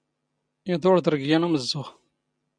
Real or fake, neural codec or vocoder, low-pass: real; none; 9.9 kHz